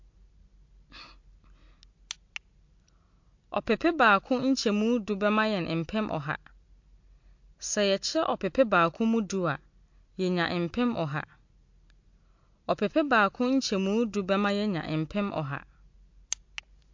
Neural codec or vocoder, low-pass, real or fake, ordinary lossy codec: none; 7.2 kHz; real; MP3, 48 kbps